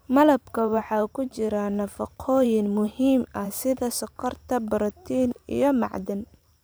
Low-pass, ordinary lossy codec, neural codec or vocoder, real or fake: none; none; vocoder, 44.1 kHz, 128 mel bands every 512 samples, BigVGAN v2; fake